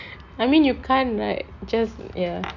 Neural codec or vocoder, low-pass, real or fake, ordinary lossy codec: none; 7.2 kHz; real; none